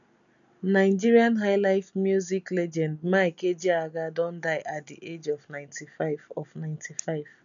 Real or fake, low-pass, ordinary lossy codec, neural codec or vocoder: real; 7.2 kHz; none; none